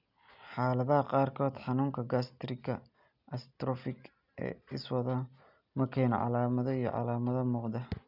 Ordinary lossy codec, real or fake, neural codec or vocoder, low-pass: none; real; none; 5.4 kHz